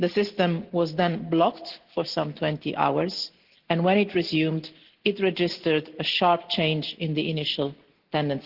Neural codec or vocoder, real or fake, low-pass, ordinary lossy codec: none; real; 5.4 kHz; Opus, 16 kbps